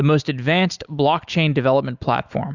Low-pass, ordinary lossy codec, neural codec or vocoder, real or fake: 7.2 kHz; Opus, 64 kbps; none; real